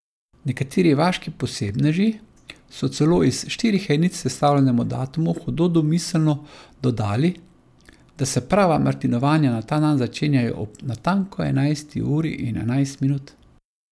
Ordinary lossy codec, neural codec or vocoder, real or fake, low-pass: none; none; real; none